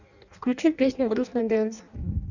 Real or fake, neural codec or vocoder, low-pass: fake; codec, 16 kHz in and 24 kHz out, 0.6 kbps, FireRedTTS-2 codec; 7.2 kHz